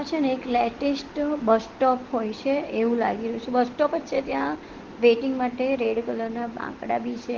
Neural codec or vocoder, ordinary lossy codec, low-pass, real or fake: none; Opus, 16 kbps; 7.2 kHz; real